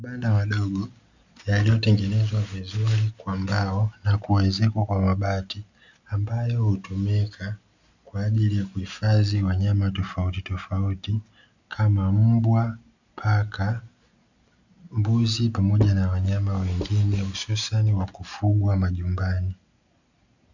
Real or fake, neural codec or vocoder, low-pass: real; none; 7.2 kHz